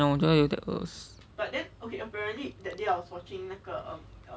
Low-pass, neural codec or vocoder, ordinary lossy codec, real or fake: none; none; none; real